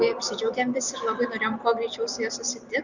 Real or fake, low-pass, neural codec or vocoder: real; 7.2 kHz; none